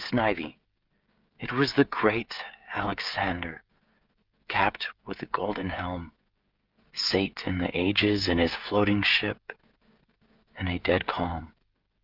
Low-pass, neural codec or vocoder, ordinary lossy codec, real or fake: 5.4 kHz; codec, 16 kHz, 16 kbps, FreqCodec, smaller model; Opus, 32 kbps; fake